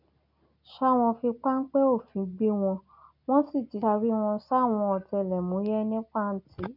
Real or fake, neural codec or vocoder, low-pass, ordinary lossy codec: real; none; 5.4 kHz; none